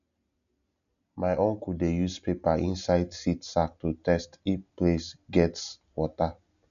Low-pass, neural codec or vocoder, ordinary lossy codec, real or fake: 7.2 kHz; none; none; real